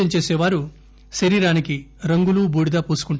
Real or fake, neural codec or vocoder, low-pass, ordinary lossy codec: real; none; none; none